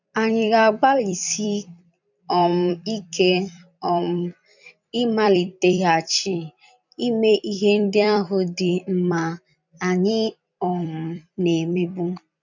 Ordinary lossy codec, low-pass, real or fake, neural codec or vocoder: none; 7.2 kHz; fake; vocoder, 44.1 kHz, 128 mel bands every 256 samples, BigVGAN v2